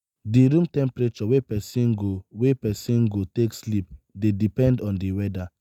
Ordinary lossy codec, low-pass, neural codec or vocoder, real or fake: none; 19.8 kHz; none; real